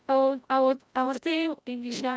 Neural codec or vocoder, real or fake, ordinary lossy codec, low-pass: codec, 16 kHz, 0.5 kbps, FreqCodec, larger model; fake; none; none